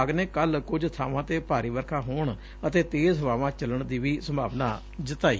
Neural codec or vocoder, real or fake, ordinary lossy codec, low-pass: none; real; none; none